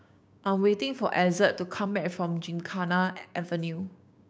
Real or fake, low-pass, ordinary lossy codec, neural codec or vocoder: fake; none; none; codec, 16 kHz, 6 kbps, DAC